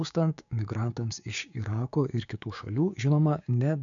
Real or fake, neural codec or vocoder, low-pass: fake; codec, 16 kHz, 6 kbps, DAC; 7.2 kHz